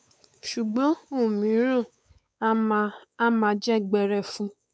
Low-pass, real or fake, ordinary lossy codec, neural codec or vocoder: none; fake; none; codec, 16 kHz, 4 kbps, X-Codec, WavLM features, trained on Multilingual LibriSpeech